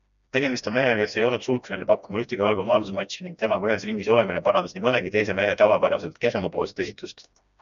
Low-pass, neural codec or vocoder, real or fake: 7.2 kHz; codec, 16 kHz, 1 kbps, FreqCodec, smaller model; fake